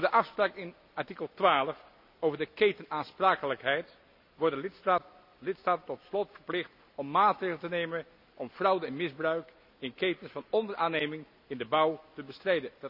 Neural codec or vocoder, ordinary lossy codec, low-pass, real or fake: none; none; 5.4 kHz; real